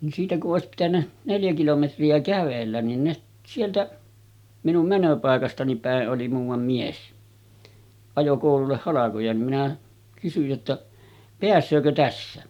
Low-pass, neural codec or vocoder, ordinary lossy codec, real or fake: 19.8 kHz; none; none; real